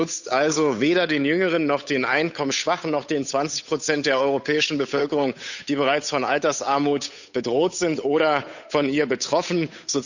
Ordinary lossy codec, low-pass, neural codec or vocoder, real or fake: none; 7.2 kHz; codec, 16 kHz, 8 kbps, FunCodec, trained on Chinese and English, 25 frames a second; fake